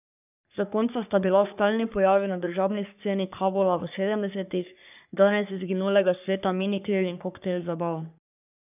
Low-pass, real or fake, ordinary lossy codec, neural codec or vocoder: 3.6 kHz; fake; none; codec, 44.1 kHz, 3.4 kbps, Pupu-Codec